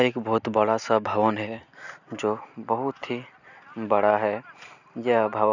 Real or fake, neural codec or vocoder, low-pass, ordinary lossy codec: real; none; 7.2 kHz; none